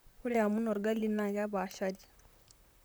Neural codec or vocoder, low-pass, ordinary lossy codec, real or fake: vocoder, 44.1 kHz, 128 mel bands, Pupu-Vocoder; none; none; fake